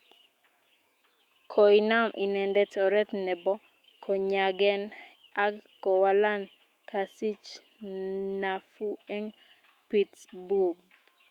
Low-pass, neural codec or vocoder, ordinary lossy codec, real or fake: 19.8 kHz; autoencoder, 48 kHz, 128 numbers a frame, DAC-VAE, trained on Japanese speech; Opus, 64 kbps; fake